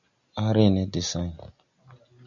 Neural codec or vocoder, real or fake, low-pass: none; real; 7.2 kHz